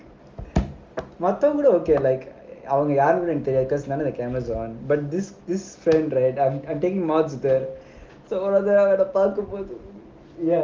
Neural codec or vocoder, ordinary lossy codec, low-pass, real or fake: none; Opus, 32 kbps; 7.2 kHz; real